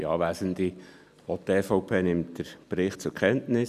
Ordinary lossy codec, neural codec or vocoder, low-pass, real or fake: none; none; 14.4 kHz; real